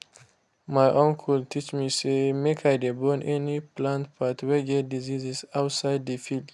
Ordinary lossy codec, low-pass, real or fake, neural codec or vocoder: none; none; real; none